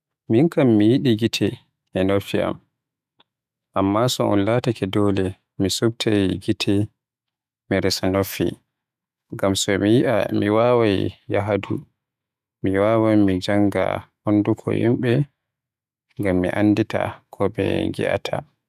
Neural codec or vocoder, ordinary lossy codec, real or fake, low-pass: autoencoder, 48 kHz, 128 numbers a frame, DAC-VAE, trained on Japanese speech; none; fake; 14.4 kHz